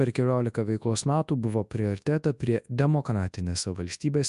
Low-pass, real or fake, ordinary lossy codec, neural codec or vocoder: 10.8 kHz; fake; MP3, 96 kbps; codec, 24 kHz, 0.9 kbps, WavTokenizer, large speech release